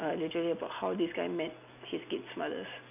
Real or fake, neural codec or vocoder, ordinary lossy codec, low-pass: real; none; none; 3.6 kHz